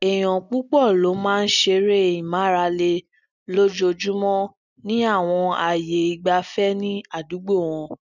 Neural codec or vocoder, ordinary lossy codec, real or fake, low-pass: none; none; real; 7.2 kHz